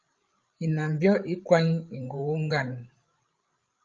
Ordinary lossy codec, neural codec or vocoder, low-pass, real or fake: Opus, 24 kbps; codec, 16 kHz, 16 kbps, FreqCodec, larger model; 7.2 kHz; fake